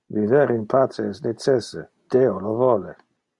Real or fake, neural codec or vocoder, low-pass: real; none; 10.8 kHz